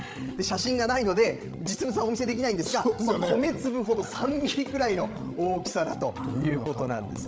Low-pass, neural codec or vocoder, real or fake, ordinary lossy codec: none; codec, 16 kHz, 16 kbps, FreqCodec, larger model; fake; none